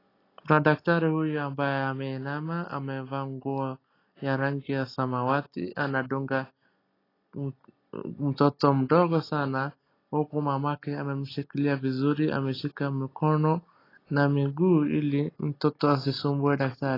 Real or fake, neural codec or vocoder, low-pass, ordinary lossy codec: real; none; 5.4 kHz; AAC, 24 kbps